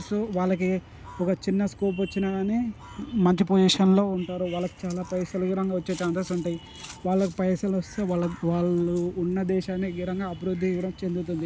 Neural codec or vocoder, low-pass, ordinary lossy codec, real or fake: none; none; none; real